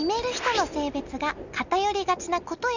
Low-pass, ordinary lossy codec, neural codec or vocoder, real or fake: 7.2 kHz; none; none; real